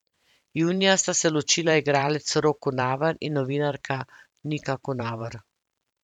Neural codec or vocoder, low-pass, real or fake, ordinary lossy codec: vocoder, 44.1 kHz, 128 mel bands every 512 samples, BigVGAN v2; 19.8 kHz; fake; none